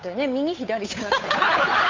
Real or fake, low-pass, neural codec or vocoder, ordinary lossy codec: fake; 7.2 kHz; codec, 16 kHz, 8 kbps, FunCodec, trained on Chinese and English, 25 frames a second; MP3, 48 kbps